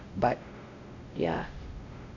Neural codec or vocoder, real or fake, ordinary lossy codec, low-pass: codec, 16 kHz, 0.5 kbps, X-Codec, WavLM features, trained on Multilingual LibriSpeech; fake; none; 7.2 kHz